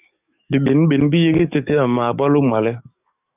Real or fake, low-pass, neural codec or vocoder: fake; 3.6 kHz; codec, 44.1 kHz, 7.8 kbps, DAC